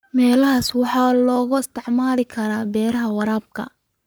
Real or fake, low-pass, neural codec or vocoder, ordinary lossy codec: fake; none; codec, 44.1 kHz, 7.8 kbps, Pupu-Codec; none